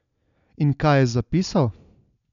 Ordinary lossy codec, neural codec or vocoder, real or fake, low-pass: none; none; real; 7.2 kHz